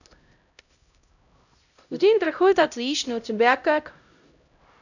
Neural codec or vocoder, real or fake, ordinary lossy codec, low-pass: codec, 16 kHz, 0.5 kbps, X-Codec, HuBERT features, trained on LibriSpeech; fake; none; 7.2 kHz